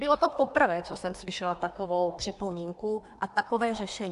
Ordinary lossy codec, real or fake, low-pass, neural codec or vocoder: AAC, 64 kbps; fake; 10.8 kHz; codec, 24 kHz, 1 kbps, SNAC